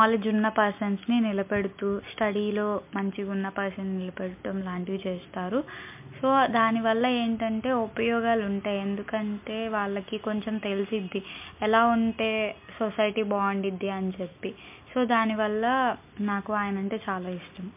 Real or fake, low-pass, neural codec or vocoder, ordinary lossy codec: real; 3.6 kHz; none; MP3, 32 kbps